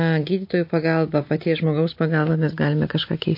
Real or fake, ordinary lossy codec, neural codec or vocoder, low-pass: real; MP3, 32 kbps; none; 5.4 kHz